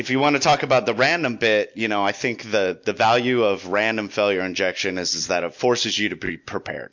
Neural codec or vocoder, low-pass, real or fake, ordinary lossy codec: none; 7.2 kHz; real; MP3, 48 kbps